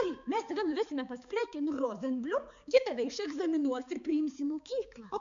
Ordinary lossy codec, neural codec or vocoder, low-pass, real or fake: MP3, 64 kbps; codec, 16 kHz, 4 kbps, X-Codec, HuBERT features, trained on balanced general audio; 7.2 kHz; fake